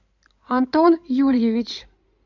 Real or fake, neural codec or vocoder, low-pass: fake; codec, 16 kHz in and 24 kHz out, 2.2 kbps, FireRedTTS-2 codec; 7.2 kHz